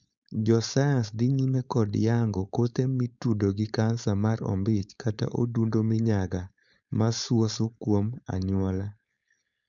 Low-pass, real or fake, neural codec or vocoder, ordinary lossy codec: 7.2 kHz; fake; codec, 16 kHz, 4.8 kbps, FACodec; none